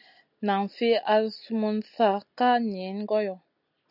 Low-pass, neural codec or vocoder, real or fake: 5.4 kHz; none; real